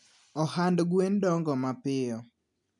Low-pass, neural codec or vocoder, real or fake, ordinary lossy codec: 10.8 kHz; none; real; none